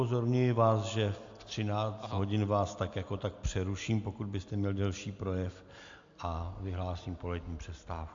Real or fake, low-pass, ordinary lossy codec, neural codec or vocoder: real; 7.2 kHz; AAC, 64 kbps; none